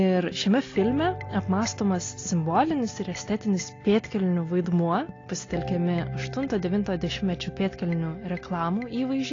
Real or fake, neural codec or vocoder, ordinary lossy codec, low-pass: real; none; AAC, 32 kbps; 7.2 kHz